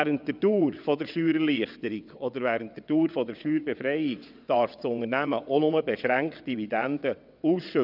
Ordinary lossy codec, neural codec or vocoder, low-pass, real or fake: none; vocoder, 22.05 kHz, 80 mel bands, WaveNeXt; 5.4 kHz; fake